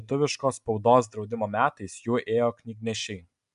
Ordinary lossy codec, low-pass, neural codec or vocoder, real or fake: AAC, 96 kbps; 10.8 kHz; none; real